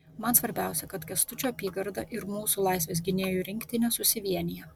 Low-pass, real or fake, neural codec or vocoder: 19.8 kHz; real; none